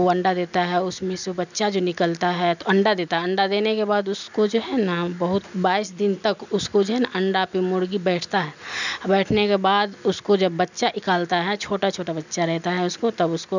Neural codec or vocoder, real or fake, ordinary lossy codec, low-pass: none; real; none; 7.2 kHz